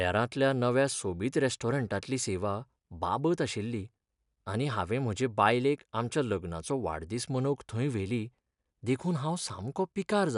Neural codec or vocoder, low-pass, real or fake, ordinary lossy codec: none; 10.8 kHz; real; none